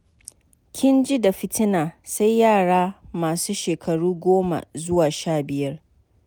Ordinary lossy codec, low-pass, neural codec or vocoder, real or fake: none; none; none; real